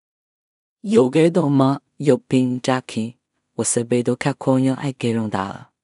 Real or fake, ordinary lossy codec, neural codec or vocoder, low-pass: fake; none; codec, 16 kHz in and 24 kHz out, 0.4 kbps, LongCat-Audio-Codec, two codebook decoder; 10.8 kHz